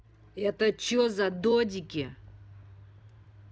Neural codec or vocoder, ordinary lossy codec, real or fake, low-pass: none; none; real; none